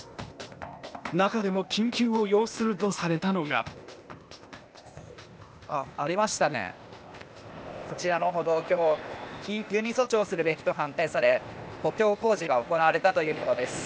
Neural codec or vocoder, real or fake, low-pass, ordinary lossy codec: codec, 16 kHz, 0.8 kbps, ZipCodec; fake; none; none